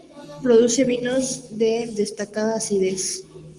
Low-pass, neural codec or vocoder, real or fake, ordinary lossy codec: 10.8 kHz; codec, 44.1 kHz, 7.8 kbps, Pupu-Codec; fake; Opus, 32 kbps